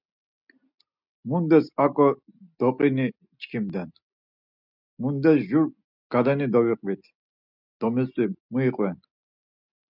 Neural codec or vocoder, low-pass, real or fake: none; 5.4 kHz; real